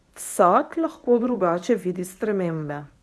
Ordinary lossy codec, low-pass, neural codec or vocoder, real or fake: none; none; codec, 24 kHz, 0.9 kbps, WavTokenizer, medium speech release version 1; fake